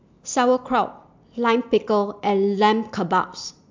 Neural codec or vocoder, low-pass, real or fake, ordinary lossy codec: none; 7.2 kHz; real; MP3, 64 kbps